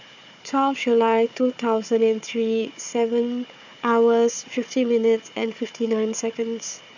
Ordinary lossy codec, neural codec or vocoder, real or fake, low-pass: none; codec, 16 kHz, 4 kbps, FunCodec, trained on Chinese and English, 50 frames a second; fake; 7.2 kHz